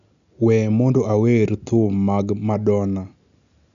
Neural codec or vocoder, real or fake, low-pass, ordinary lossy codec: none; real; 7.2 kHz; none